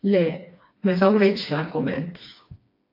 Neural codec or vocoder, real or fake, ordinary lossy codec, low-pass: codec, 16 kHz, 2 kbps, FreqCodec, smaller model; fake; AAC, 32 kbps; 5.4 kHz